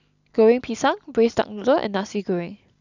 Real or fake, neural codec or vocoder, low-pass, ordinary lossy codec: real; none; 7.2 kHz; none